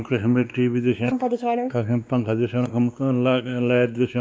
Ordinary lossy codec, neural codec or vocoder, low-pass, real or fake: none; codec, 16 kHz, 2 kbps, X-Codec, WavLM features, trained on Multilingual LibriSpeech; none; fake